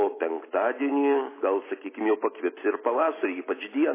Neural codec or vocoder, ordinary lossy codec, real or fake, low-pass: none; MP3, 16 kbps; real; 3.6 kHz